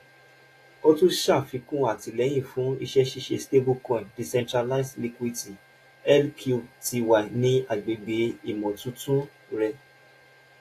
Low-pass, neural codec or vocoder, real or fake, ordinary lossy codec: 14.4 kHz; none; real; AAC, 48 kbps